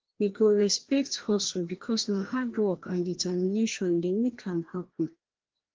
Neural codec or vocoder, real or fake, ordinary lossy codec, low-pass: codec, 16 kHz, 1 kbps, FreqCodec, larger model; fake; Opus, 16 kbps; 7.2 kHz